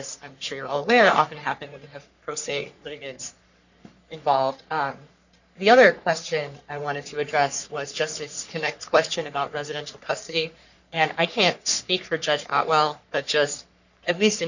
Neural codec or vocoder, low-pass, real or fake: codec, 44.1 kHz, 3.4 kbps, Pupu-Codec; 7.2 kHz; fake